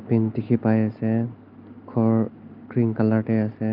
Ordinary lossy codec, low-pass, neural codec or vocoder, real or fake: Opus, 32 kbps; 5.4 kHz; none; real